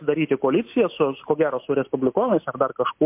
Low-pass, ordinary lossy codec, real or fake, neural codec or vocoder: 3.6 kHz; MP3, 32 kbps; real; none